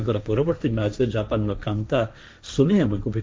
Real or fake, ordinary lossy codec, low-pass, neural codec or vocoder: fake; none; none; codec, 16 kHz, 1.1 kbps, Voila-Tokenizer